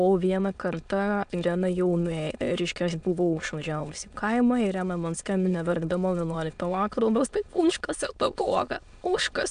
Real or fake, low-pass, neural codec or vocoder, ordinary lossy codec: fake; 9.9 kHz; autoencoder, 22.05 kHz, a latent of 192 numbers a frame, VITS, trained on many speakers; MP3, 64 kbps